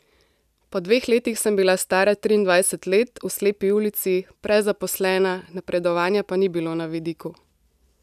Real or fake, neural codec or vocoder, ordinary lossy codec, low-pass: real; none; none; 14.4 kHz